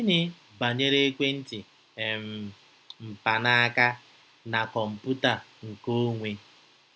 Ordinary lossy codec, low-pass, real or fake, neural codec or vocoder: none; none; real; none